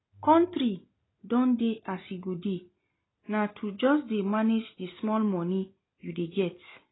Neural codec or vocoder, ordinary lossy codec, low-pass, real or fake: none; AAC, 16 kbps; 7.2 kHz; real